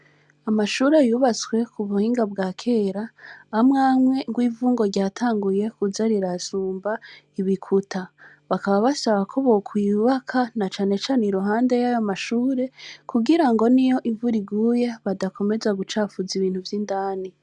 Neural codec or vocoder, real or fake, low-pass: none; real; 10.8 kHz